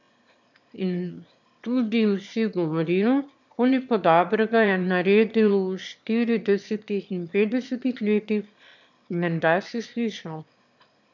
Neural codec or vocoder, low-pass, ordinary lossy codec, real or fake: autoencoder, 22.05 kHz, a latent of 192 numbers a frame, VITS, trained on one speaker; 7.2 kHz; MP3, 64 kbps; fake